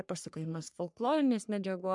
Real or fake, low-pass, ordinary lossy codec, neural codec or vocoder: fake; 10.8 kHz; MP3, 96 kbps; codec, 44.1 kHz, 3.4 kbps, Pupu-Codec